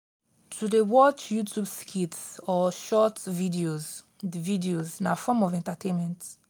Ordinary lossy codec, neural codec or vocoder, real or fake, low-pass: none; none; real; none